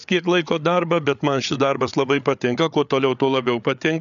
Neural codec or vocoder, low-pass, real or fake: codec, 16 kHz, 8 kbps, FreqCodec, larger model; 7.2 kHz; fake